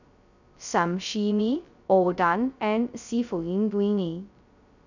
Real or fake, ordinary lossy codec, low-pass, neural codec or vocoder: fake; none; 7.2 kHz; codec, 16 kHz, 0.2 kbps, FocalCodec